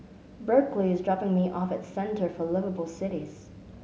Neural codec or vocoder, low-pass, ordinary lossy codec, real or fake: none; none; none; real